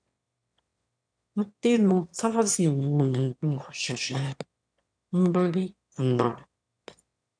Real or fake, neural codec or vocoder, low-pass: fake; autoencoder, 22.05 kHz, a latent of 192 numbers a frame, VITS, trained on one speaker; 9.9 kHz